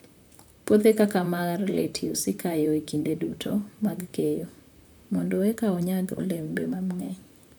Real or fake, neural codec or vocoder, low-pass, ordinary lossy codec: fake; vocoder, 44.1 kHz, 128 mel bands, Pupu-Vocoder; none; none